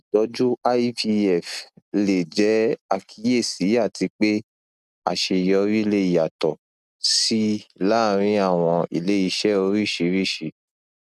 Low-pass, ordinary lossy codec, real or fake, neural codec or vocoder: 14.4 kHz; none; real; none